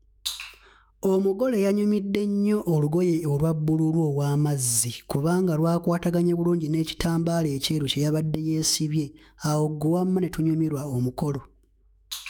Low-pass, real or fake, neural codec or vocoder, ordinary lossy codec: none; fake; autoencoder, 48 kHz, 128 numbers a frame, DAC-VAE, trained on Japanese speech; none